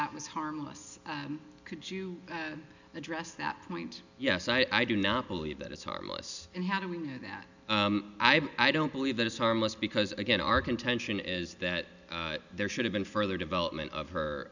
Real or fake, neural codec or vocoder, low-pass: real; none; 7.2 kHz